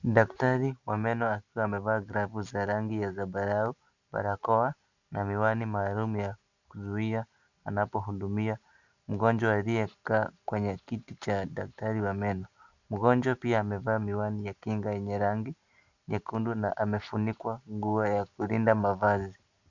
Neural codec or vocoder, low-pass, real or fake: none; 7.2 kHz; real